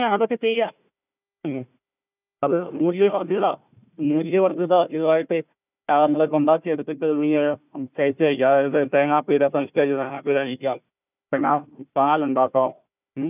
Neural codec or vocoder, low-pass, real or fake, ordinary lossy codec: codec, 16 kHz, 1 kbps, FunCodec, trained on Chinese and English, 50 frames a second; 3.6 kHz; fake; none